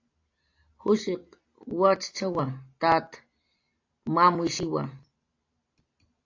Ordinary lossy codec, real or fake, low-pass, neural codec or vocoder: AAC, 48 kbps; real; 7.2 kHz; none